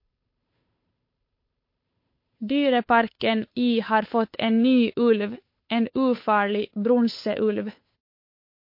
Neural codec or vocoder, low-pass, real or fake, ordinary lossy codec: codec, 16 kHz, 8 kbps, FunCodec, trained on Chinese and English, 25 frames a second; 5.4 kHz; fake; MP3, 32 kbps